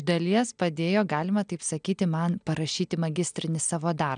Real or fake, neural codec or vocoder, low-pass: real; none; 9.9 kHz